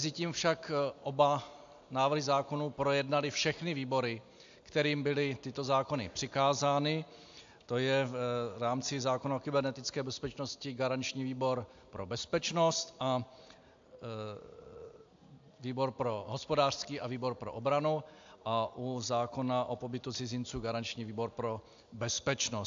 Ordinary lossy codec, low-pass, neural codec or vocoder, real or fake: AAC, 64 kbps; 7.2 kHz; none; real